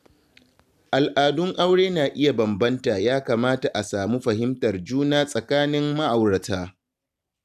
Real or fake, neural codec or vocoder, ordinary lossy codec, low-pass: real; none; none; 14.4 kHz